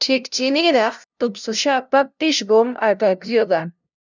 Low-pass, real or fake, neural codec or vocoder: 7.2 kHz; fake; codec, 16 kHz, 0.5 kbps, FunCodec, trained on LibriTTS, 25 frames a second